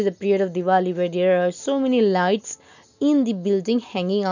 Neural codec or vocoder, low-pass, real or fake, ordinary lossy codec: none; 7.2 kHz; real; none